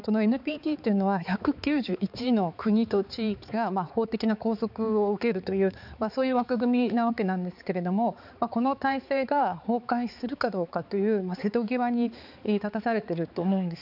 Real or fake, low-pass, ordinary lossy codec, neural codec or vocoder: fake; 5.4 kHz; none; codec, 16 kHz, 4 kbps, X-Codec, HuBERT features, trained on balanced general audio